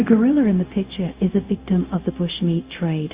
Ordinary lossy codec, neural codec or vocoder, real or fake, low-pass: Opus, 64 kbps; codec, 16 kHz, 0.4 kbps, LongCat-Audio-Codec; fake; 3.6 kHz